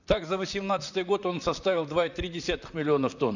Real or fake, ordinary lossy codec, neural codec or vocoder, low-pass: fake; none; vocoder, 44.1 kHz, 128 mel bands, Pupu-Vocoder; 7.2 kHz